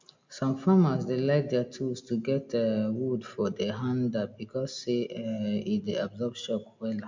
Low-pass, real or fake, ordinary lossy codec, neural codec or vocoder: 7.2 kHz; real; MP3, 64 kbps; none